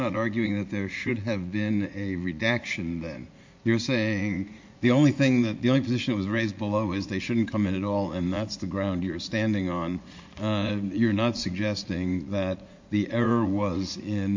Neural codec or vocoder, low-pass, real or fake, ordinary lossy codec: vocoder, 44.1 kHz, 80 mel bands, Vocos; 7.2 kHz; fake; MP3, 48 kbps